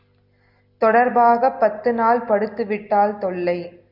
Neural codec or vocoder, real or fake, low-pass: none; real; 5.4 kHz